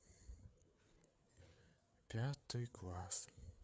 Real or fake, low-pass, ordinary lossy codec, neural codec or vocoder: fake; none; none; codec, 16 kHz, 4 kbps, FreqCodec, larger model